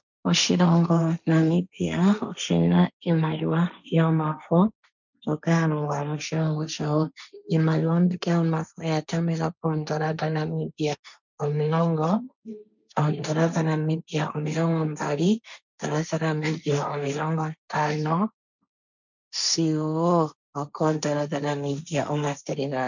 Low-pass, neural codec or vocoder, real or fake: 7.2 kHz; codec, 16 kHz, 1.1 kbps, Voila-Tokenizer; fake